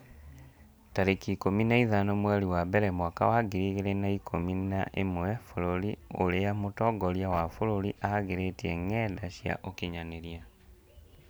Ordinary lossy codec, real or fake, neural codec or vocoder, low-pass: none; real; none; none